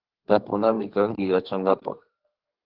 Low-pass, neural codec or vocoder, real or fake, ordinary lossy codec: 5.4 kHz; codec, 32 kHz, 1.9 kbps, SNAC; fake; Opus, 16 kbps